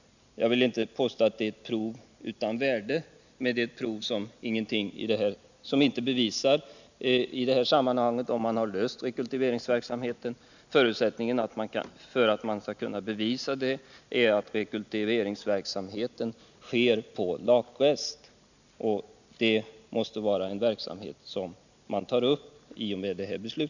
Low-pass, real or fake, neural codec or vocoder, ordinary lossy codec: 7.2 kHz; real; none; none